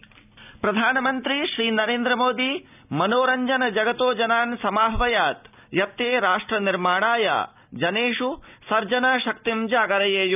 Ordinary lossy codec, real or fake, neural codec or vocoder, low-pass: none; real; none; 3.6 kHz